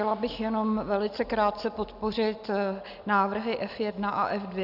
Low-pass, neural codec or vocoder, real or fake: 5.4 kHz; none; real